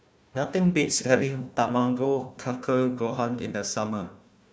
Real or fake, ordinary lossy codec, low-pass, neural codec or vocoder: fake; none; none; codec, 16 kHz, 1 kbps, FunCodec, trained on Chinese and English, 50 frames a second